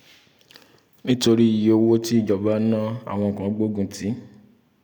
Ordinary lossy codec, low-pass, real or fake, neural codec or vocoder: none; 19.8 kHz; real; none